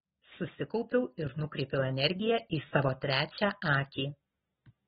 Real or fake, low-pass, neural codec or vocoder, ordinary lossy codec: real; 19.8 kHz; none; AAC, 16 kbps